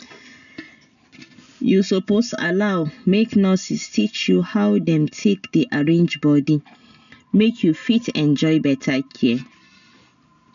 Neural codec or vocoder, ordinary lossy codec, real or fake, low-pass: none; none; real; 7.2 kHz